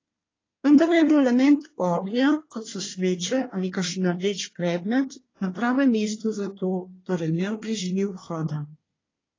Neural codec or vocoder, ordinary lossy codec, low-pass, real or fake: codec, 24 kHz, 1 kbps, SNAC; AAC, 32 kbps; 7.2 kHz; fake